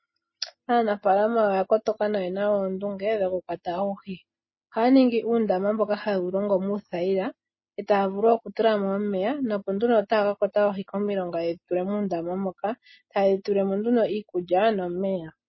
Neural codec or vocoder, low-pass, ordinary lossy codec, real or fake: none; 7.2 kHz; MP3, 24 kbps; real